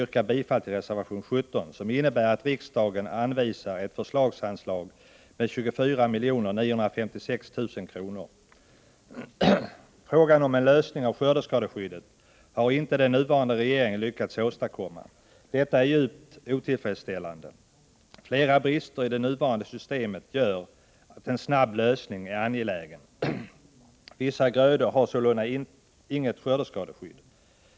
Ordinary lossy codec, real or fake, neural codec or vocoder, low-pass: none; real; none; none